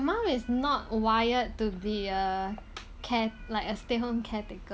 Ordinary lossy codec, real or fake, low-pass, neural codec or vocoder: none; real; none; none